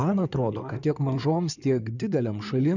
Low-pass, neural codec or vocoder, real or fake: 7.2 kHz; codec, 16 kHz in and 24 kHz out, 2.2 kbps, FireRedTTS-2 codec; fake